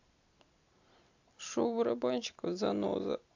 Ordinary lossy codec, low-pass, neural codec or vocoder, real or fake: AAC, 48 kbps; 7.2 kHz; none; real